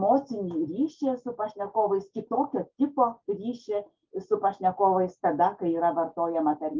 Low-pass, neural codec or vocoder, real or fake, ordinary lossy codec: 7.2 kHz; none; real; Opus, 32 kbps